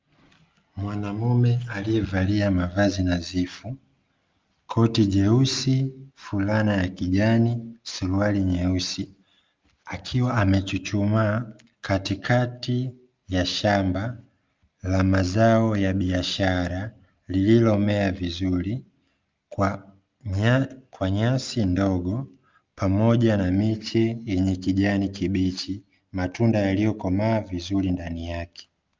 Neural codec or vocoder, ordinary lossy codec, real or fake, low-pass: none; Opus, 24 kbps; real; 7.2 kHz